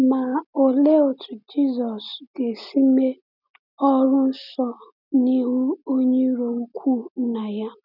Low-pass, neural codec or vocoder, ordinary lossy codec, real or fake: 5.4 kHz; none; none; real